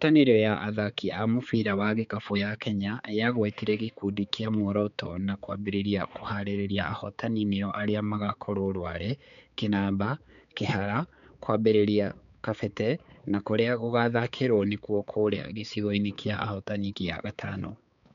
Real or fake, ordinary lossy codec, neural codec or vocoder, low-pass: fake; MP3, 96 kbps; codec, 16 kHz, 4 kbps, X-Codec, HuBERT features, trained on balanced general audio; 7.2 kHz